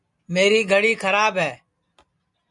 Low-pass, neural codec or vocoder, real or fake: 10.8 kHz; none; real